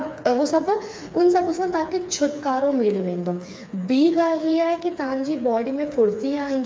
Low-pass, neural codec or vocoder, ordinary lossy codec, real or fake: none; codec, 16 kHz, 4 kbps, FreqCodec, smaller model; none; fake